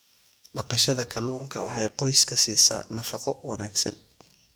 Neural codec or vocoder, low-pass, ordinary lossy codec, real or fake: codec, 44.1 kHz, 2.6 kbps, DAC; none; none; fake